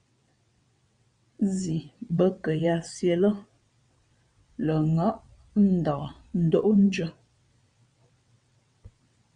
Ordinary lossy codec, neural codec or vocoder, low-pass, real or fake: Opus, 64 kbps; vocoder, 22.05 kHz, 80 mel bands, WaveNeXt; 9.9 kHz; fake